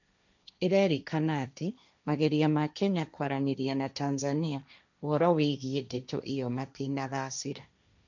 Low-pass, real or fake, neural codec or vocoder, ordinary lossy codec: 7.2 kHz; fake; codec, 16 kHz, 1.1 kbps, Voila-Tokenizer; none